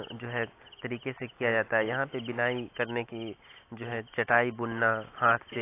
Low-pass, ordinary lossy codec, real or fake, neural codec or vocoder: 3.6 kHz; AAC, 24 kbps; real; none